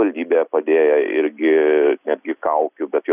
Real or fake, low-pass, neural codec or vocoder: real; 3.6 kHz; none